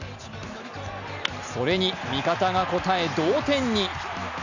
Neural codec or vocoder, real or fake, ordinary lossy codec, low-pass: none; real; none; 7.2 kHz